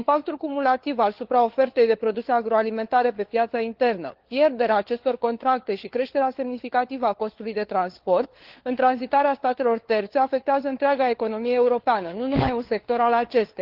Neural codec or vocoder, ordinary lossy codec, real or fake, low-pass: codec, 16 kHz, 4 kbps, FunCodec, trained on LibriTTS, 50 frames a second; Opus, 16 kbps; fake; 5.4 kHz